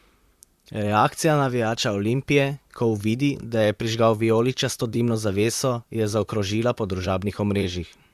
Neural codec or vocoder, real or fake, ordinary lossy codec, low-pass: vocoder, 44.1 kHz, 128 mel bands, Pupu-Vocoder; fake; Opus, 64 kbps; 14.4 kHz